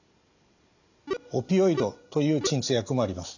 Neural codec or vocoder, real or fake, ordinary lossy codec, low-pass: none; real; none; 7.2 kHz